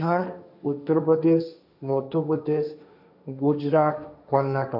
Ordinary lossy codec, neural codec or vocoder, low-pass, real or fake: none; codec, 16 kHz, 1.1 kbps, Voila-Tokenizer; 5.4 kHz; fake